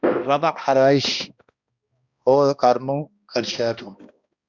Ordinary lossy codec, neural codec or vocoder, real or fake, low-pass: Opus, 64 kbps; codec, 16 kHz, 1 kbps, X-Codec, HuBERT features, trained on balanced general audio; fake; 7.2 kHz